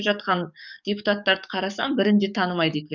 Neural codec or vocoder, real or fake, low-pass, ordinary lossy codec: codec, 44.1 kHz, 7.8 kbps, DAC; fake; 7.2 kHz; none